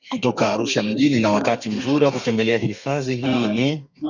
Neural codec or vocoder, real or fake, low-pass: codec, 32 kHz, 1.9 kbps, SNAC; fake; 7.2 kHz